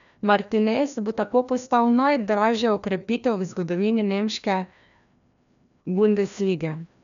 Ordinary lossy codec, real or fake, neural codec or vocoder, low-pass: none; fake; codec, 16 kHz, 1 kbps, FreqCodec, larger model; 7.2 kHz